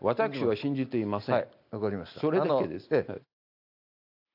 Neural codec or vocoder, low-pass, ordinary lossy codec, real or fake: none; 5.4 kHz; AAC, 48 kbps; real